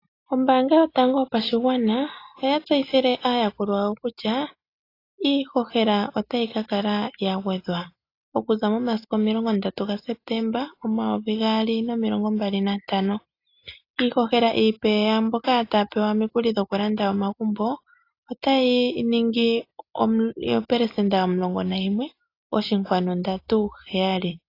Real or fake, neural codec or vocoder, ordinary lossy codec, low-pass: real; none; AAC, 32 kbps; 5.4 kHz